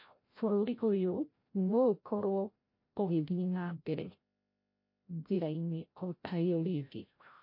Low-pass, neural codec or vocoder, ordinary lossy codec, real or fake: 5.4 kHz; codec, 16 kHz, 0.5 kbps, FreqCodec, larger model; MP3, 32 kbps; fake